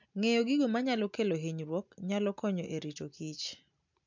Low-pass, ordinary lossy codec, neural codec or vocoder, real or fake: 7.2 kHz; MP3, 64 kbps; none; real